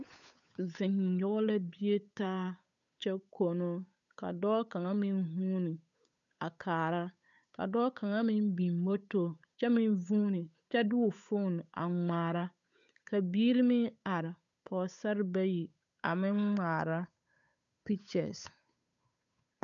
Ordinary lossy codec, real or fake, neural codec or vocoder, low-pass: MP3, 96 kbps; fake; codec, 16 kHz, 8 kbps, FunCodec, trained on Chinese and English, 25 frames a second; 7.2 kHz